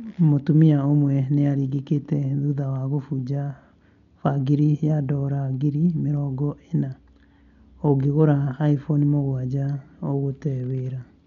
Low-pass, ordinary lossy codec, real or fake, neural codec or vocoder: 7.2 kHz; none; real; none